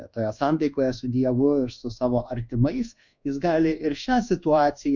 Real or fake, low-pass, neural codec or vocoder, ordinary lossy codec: fake; 7.2 kHz; codec, 24 kHz, 1.2 kbps, DualCodec; MP3, 64 kbps